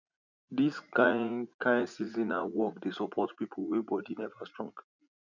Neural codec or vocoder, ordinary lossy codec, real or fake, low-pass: vocoder, 44.1 kHz, 80 mel bands, Vocos; none; fake; 7.2 kHz